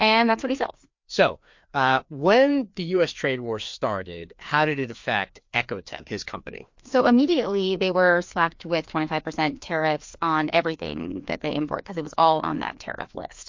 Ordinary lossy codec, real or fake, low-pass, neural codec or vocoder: MP3, 48 kbps; fake; 7.2 kHz; codec, 16 kHz, 2 kbps, FreqCodec, larger model